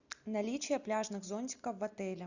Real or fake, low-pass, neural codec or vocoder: real; 7.2 kHz; none